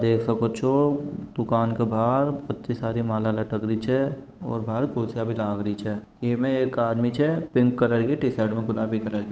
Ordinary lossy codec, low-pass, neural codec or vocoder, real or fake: none; none; codec, 16 kHz, 8 kbps, FunCodec, trained on Chinese and English, 25 frames a second; fake